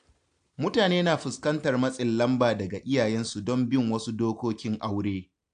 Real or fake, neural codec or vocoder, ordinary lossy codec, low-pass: real; none; AAC, 64 kbps; 9.9 kHz